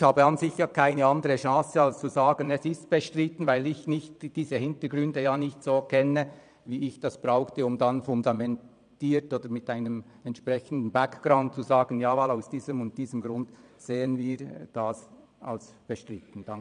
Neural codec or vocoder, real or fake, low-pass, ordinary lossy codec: vocoder, 22.05 kHz, 80 mel bands, Vocos; fake; 9.9 kHz; none